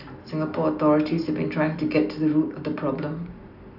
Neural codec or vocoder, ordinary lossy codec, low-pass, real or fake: none; none; 5.4 kHz; real